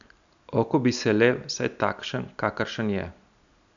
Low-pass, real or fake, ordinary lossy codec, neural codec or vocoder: 7.2 kHz; real; none; none